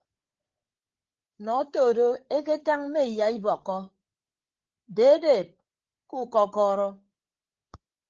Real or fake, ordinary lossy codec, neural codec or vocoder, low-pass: fake; Opus, 16 kbps; codec, 16 kHz, 8 kbps, FreqCodec, larger model; 7.2 kHz